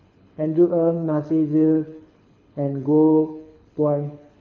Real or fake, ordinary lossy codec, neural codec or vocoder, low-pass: fake; none; codec, 24 kHz, 6 kbps, HILCodec; 7.2 kHz